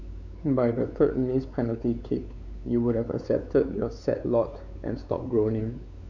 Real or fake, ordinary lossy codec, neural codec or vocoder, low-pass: fake; none; codec, 16 kHz, 4 kbps, X-Codec, WavLM features, trained on Multilingual LibriSpeech; 7.2 kHz